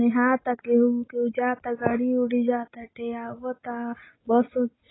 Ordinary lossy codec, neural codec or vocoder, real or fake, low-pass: AAC, 16 kbps; none; real; 7.2 kHz